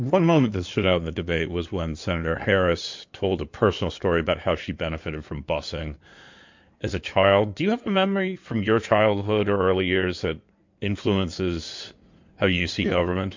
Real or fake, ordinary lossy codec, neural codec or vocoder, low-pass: fake; MP3, 48 kbps; codec, 16 kHz in and 24 kHz out, 2.2 kbps, FireRedTTS-2 codec; 7.2 kHz